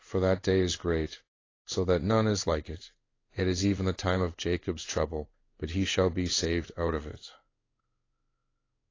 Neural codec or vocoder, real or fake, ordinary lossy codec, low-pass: codec, 16 kHz in and 24 kHz out, 1 kbps, XY-Tokenizer; fake; AAC, 32 kbps; 7.2 kHz